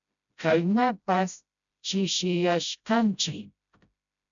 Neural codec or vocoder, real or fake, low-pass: codec, 16 kHz, 0.5 kbps, FreqCodec, smaller model; fake; 7.2 kHz